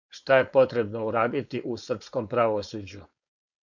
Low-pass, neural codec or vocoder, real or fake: 7.2 kHz; codec, 16 kHz, 4.8 kbps, FACodec; fake